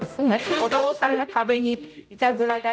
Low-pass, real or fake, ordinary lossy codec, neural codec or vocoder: none; fake; none; codec, 16 kHz, 0.5 kbps, X-Codec, HuBERT features, trained on general audio